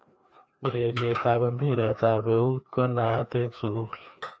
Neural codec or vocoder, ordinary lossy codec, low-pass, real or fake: codec, 16 kHz, 2 kbps, FreqCodec, larger model; none; none; fake